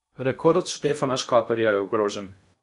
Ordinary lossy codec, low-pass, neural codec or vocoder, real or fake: none; 10.8 kHz; codec, 16 kHz in and 24 kHz out, 0.6 kbps, FocalCodec, streaming, 2048 codes; fake